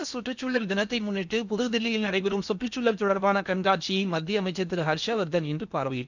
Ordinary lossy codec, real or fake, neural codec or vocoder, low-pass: none; fake; codec, 16 kHz in and 24 kHz out, 0.8 kbps, FocalCodec, streaming, 65536 codes; 7.2 kHz